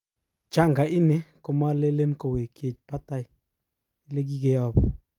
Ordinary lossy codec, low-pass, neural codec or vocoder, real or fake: Opus, 32 kbps; 19.8 kHz; none; real